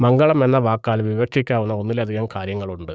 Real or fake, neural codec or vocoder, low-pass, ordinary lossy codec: fake; codec, 16 kHz, 6 kbps, DAC; none; none